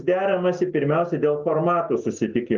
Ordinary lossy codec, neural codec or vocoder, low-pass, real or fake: Opus, 24 kbps; none; 7.2 kHz; real